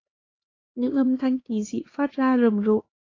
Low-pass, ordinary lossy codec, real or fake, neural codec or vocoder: 7.2 kHz; AAC, 32 kbps; fake; codec, 16 kHz, 2 kbps, X-Codec, HuBERT features, trained on LibriSpeech